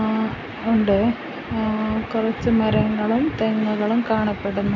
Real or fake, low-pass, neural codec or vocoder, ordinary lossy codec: real; 7.2 kHz; none; none